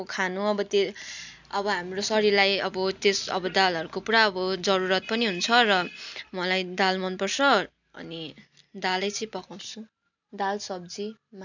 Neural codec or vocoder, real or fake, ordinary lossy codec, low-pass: none; real; none; 7.2 kHz